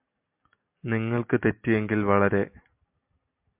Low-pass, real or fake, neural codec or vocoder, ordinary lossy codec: 3.6 kHz; real; none; MP3, 32 kbps